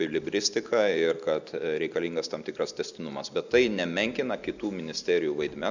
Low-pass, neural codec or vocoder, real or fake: 7.2 kHz; none; real